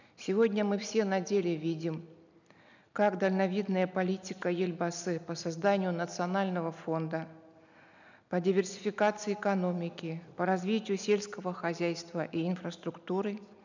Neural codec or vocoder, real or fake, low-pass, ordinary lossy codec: none; real; 7.2 kHz; none